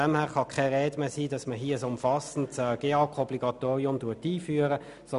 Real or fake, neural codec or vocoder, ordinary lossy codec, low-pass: real; none; none; 10.8 kHz